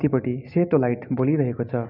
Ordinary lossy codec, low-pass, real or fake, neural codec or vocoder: none; 5.4 kHz; real; none